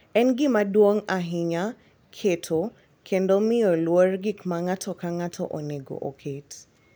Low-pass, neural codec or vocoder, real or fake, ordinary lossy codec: none; none; real; none